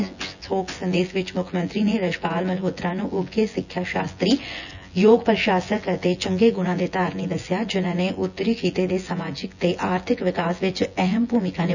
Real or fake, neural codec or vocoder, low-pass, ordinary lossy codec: fake; vocoder, 24 kHz, 100 mel bands, Vocos; 7.2 kHz; none